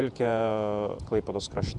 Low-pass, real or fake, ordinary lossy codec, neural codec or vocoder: 10.8 kHz; fake; Opus, 64 kbps; vocoder, 48 kHz, 128 mel bands, Vocos